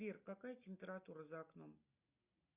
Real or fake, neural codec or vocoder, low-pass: real; none; 3.6 kHz